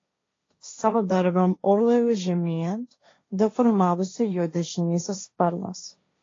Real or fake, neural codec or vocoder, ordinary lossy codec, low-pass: fake; codec, 16 kHz, 1.1 kbps, Voila-Tokenizer; AAC, 32 kbps; 7.2 kHz